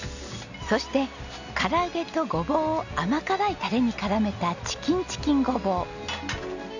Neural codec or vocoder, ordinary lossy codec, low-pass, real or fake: none; none; 7.2 kHz; real